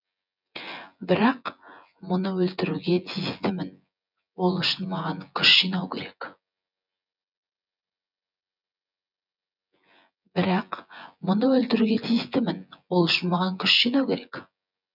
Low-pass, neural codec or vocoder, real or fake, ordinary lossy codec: 5.4 kHz; vocoder, 24 kHz, 100 mel bands, Vocos; fake; none